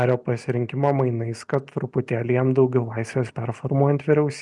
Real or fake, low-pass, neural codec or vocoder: real; 10.8 kHz; none